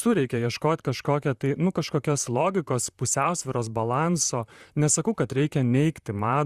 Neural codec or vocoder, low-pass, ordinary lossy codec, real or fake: vocoder, 44.1 kHz, 128 mel bands, Pupu-Vocoder; 14.4 kHz; Opus, 64 kbps; fake